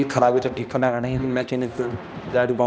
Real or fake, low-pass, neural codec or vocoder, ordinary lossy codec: fake; none; codec, 16 kHz, 1 kbps, X-Codec, HuBERT features, trained on balanced general audio; none